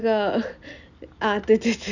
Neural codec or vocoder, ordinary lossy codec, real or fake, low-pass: none; none; real; 7.2 kHz